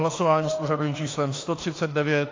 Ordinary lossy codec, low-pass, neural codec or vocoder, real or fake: AAC, 32 kbps; 7.2 kHz; autoencoder, 48 kHz, 32 numbers a frame, DAC-VAE, trained on Japanese speech; fake